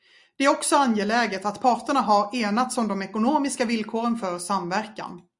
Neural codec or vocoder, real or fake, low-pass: none; real; 10.8 kHz